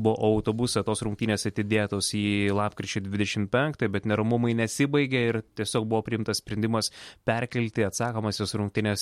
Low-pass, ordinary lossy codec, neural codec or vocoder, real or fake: 19.8 kHz; MP3, 64 kbps; none; real